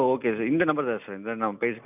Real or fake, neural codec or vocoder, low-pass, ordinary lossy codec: real; none; 3.6 kHz; none